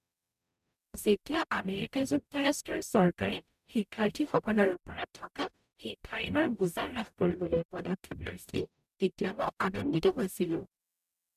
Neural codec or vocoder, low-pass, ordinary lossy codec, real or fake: codec, 44.1 kHz, 0.9 kbps, DAC; 14.4 kHz; none; fake